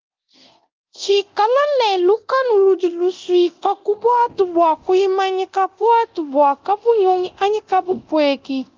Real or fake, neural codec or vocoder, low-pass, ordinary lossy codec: fake; codec, 24 kHz, 0.9 kbps, DualCodec; 7.2 kHz; Opus, 24 kbps